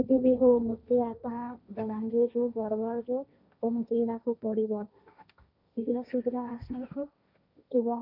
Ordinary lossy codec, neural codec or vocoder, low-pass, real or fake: none; codec, 16 kHz, 1.1 kbps, Voila-Tokenizer; 5.4 kHz; fake